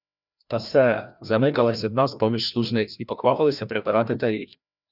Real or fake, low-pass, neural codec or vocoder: fake; 5.4 kHz; codec, 16 kHz, 1 kbps, FreqCodec, larger model